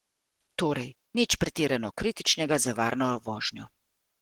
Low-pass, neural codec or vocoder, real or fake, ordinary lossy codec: 19.8 kHz; codec, 44.1 kHz, 7.8 kbps, Pupu-Codec; fake; Opus, 16 kbps